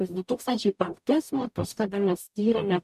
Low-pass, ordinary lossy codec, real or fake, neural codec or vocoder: 14.4 kHz; MP3, 96 kbps; fake; codec, 44.1 kHz, 0.9 kbps, DAC